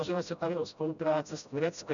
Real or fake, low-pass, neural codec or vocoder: fake; 7.2 kHz; codec, 16 kHz, 0.5 kbps, FreqCodec, smaller model